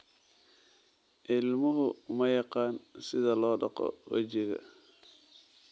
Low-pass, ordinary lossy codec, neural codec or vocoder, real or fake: none; none; none; real